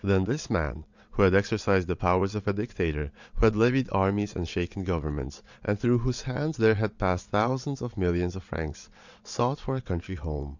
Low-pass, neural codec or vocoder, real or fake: 7.2 kHz; vocoder, 22.05 kHz, 80 mel bands, WaveNeXt; fake